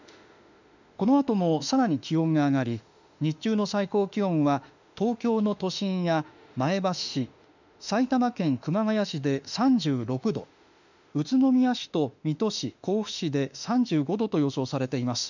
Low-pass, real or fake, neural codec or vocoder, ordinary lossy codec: 7.2 kHz; fake; autoencoder, 48 kHz, 32 numbers a frame, DAC-VAE, trained on Japanese speech; none